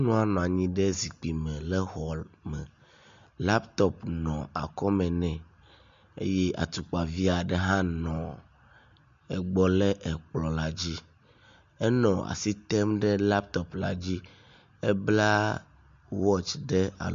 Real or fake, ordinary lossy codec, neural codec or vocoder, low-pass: real; MP3, 48 kbps; none; 7.2 kHz